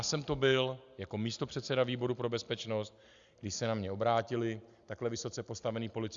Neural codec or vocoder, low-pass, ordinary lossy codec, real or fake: none; 7.2 kHz; Opus, 64 kbps; real